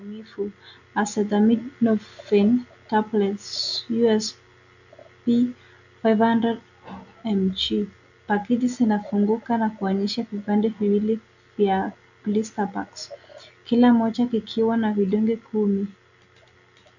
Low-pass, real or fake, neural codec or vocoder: 7.2 kHz; real; none